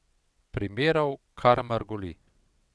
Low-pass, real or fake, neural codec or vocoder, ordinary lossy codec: none; fake; vocoder, 22.05 kHz, 80 mel bands, WaveNeXt; none